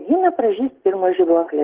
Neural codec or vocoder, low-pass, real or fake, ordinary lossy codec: none; 3.6 kHz; real; Opus, 16 kbps